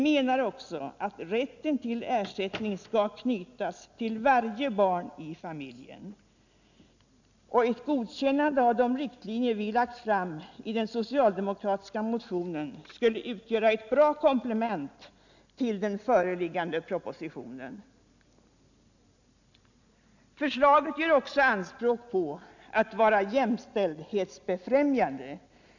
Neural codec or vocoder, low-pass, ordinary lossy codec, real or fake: none; 7.2 kHz; none; real